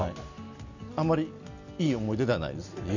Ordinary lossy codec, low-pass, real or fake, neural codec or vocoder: none; 7.2 kHz; real; none